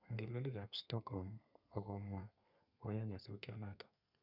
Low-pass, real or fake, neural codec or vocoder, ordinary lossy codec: 5.4 kHz; fake; codec, 32 kHz, 1.9 kbps, SNAC; none